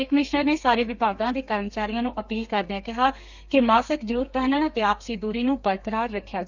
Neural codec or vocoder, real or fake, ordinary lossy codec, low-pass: codec, 32 kHz, 1.9 kbps, SNAC; fake; none; 7.2 kHz